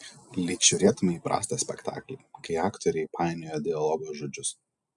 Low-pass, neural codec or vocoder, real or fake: 10.8 kHz; none; real